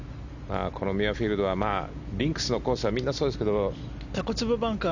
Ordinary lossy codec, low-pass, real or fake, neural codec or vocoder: none; 7.2 kHz; real; none